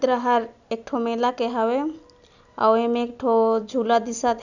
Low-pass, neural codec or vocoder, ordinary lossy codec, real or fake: 7.2 kHz; none; none; real